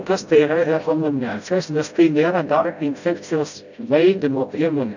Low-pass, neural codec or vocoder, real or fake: 7.2 kHz; codec, 16 kHz, 0.5 kbps, FreqCodec, smaller model; fake